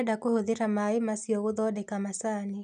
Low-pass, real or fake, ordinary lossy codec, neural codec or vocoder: 9.9 kHz; real; none; none